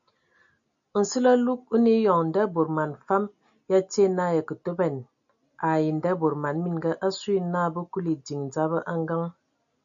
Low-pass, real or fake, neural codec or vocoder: 7.2 kHz; real; none